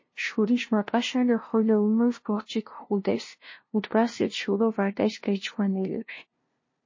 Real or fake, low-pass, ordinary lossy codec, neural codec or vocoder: fake; 7.2 kHz; MP3, 32 kbps; codec, 16 kHz, 0.5 kbps, FunCodec, trained on LibriTTS, 25 frames a second